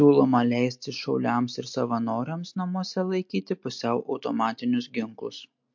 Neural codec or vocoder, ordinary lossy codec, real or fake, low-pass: none; MP3, 48 kbps; real; 7.2 kHz